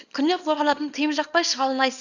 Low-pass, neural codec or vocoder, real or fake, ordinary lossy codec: 7.2 kHz; codec, 24 kHz, 0.9 kbps, WavTokenizer, small release; fake; none